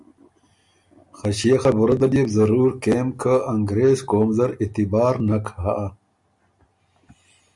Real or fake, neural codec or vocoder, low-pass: real; none; 10.8 kHz